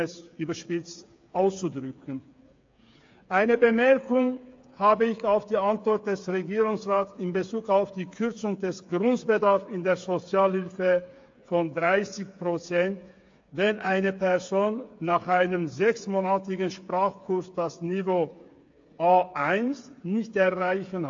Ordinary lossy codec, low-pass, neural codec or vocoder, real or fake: AAC, 48 kbps; 7.2 kHz; codec, 16 kHz, 8 kbps, FreqCodec, smaller model; fake